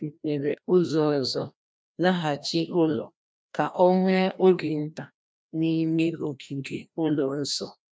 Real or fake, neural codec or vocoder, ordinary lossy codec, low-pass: fake; codec, 16 kHz, 1 kbps, FreqCodec, larger model; none; none